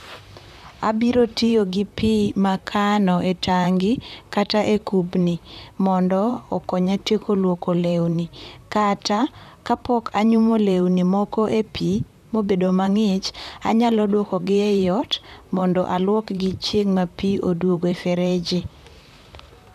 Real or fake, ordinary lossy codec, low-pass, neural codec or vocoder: fake; none; 14.4 kHz; vocoder, 44.1 kHz, 128 mel bands, Pupu-Vocoder